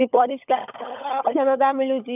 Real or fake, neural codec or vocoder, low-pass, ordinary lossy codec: fake; codec, 16 kHz, 16 kbps, FunCodec, trained on LibriTTS, 50 frames a second; 3.6 kHz; none